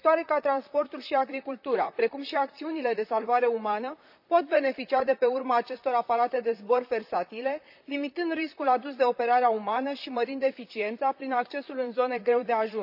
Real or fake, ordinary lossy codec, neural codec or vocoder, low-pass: fake; none; vocoder, 44.1 kHz, 128 mel bands, Pupu-Vocoder; 5.4 kHz